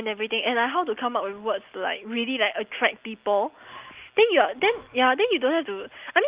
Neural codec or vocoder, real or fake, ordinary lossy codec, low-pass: none; real; Opus, 32 kbps; 3.6 kHz